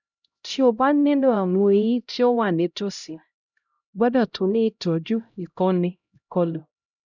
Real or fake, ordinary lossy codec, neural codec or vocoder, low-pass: fake; none; codec, 16 kHz, 0.5 kbps, X-Codec, HuBERT features, trained on LibriSpeech; 7.2 kHz